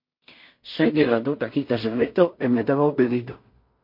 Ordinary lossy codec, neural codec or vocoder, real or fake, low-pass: MP3, 32 kbps; codec, 16 kHz in and 24 kHz out, 0.4 kbps, LongCat-Audio-Codec, two codebook decoder; fake; 5.4 kHz